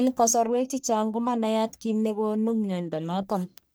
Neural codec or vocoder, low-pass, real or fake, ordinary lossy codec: codec, 44.1 kHz, 1.7 kbps, Pupu-Codec; none; fake; none